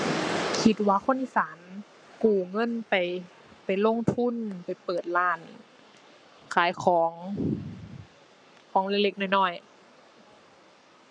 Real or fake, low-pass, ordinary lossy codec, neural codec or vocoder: fake; 9.9 kHz; none; codec, 44.1 kHz, 7.8 kbps, Pupu-Codec